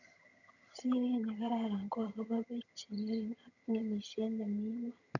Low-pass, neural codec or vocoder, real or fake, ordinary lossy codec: 7.2 kHz; vocoder, 22.05 kHz, 80 mel bands, HiFi-GAN; fake; none